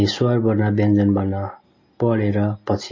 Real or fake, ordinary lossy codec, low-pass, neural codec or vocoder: real; MP3, 32 kbps; 7.2 kHz; none